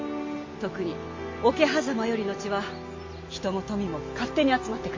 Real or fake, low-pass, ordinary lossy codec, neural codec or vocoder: real; 7.2 kHz; MP3, 48 kbps; none